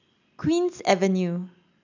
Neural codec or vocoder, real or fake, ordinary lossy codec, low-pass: none; real; none; 7.2 kHz